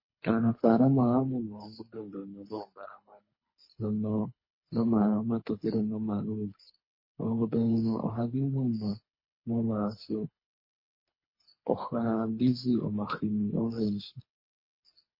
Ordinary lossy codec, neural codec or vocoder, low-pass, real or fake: MP3, 24 kbps; codec, 24 kHz, 3 kbps, HILCodec; 5.4 kHz; fake